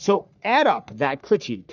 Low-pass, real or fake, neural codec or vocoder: 7.2 kHz; fake; codec, 44.1 kHz, 3.4 kbps, Pupu-Codec